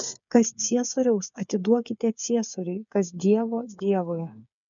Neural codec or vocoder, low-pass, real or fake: codec, 16 kHz, 8 kbps, FreqCodec, smaller model; 7.2 kHz; fake